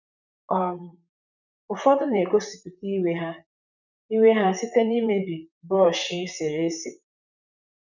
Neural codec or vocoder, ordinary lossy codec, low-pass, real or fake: vocoder, 44.1 kHz, 128 mel bands, Pupu-Vocoder; none; 7.2 kHz; fake